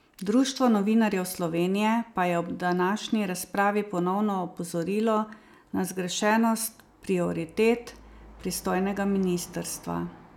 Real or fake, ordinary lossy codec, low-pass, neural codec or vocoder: real; none; 19.8 kHz; none